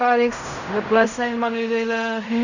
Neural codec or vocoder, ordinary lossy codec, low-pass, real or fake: codec, 16 kHz in and 24 kHz out, 0.4 kbps, LongCat-Audio-Codec, fine tuned four codebook decoder; none; 7.2 kHz; fake